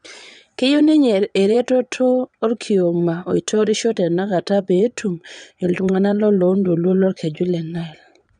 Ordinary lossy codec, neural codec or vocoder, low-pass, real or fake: none; vocoder, 22.05 kHz, 80 mel bands, Vocos; 9.9 kHz; fake